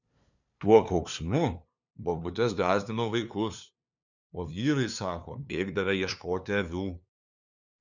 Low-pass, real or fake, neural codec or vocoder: 7.2 kHz; fake; codec, 16 kHz, 2 kbps, FunCodec, trained on LibriTTS, 25 frames a second